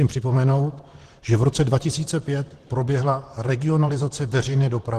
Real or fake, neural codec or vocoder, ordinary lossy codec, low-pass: fake; vocoder, 44.1 kHz, 128 mel bands, Pupu-Vocoder; Opus, 16 kbps; 14.4 kHz